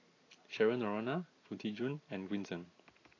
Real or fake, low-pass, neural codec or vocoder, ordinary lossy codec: real; 7.2 kHz; none; AAC, 32 kbps